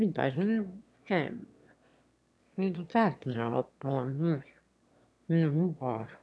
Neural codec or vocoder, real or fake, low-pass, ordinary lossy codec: autoencoder, 22.05 kHz, a latent of 192 numbers a frame, VITS, trained on one speaker; fake; none; none